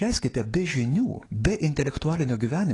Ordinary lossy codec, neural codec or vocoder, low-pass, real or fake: AAC, 32 kbps; codec, 44.1 kHz, 7.8 kbps, DAC; 10.8 kHz; fake